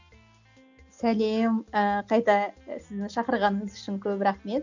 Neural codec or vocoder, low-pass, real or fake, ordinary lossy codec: none; 7.2 kHz; real; none